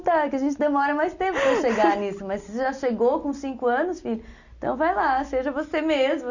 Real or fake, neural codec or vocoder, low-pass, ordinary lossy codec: real; none; 7.2 kHz; none